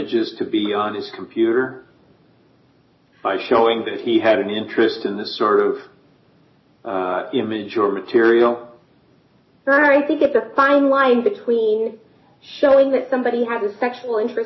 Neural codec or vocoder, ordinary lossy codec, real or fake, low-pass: none; MP3, 24 kbps; real; 7.2 kHz